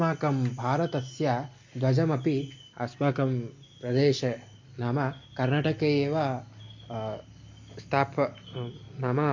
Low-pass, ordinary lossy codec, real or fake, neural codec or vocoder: 7.2 kHz; MP3, 48 kbps; real; none